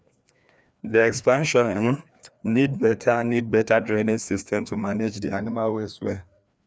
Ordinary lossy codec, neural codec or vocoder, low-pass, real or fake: none; codec, 16 kHz, 2 kbps, FreqCodec, larger model; none; fake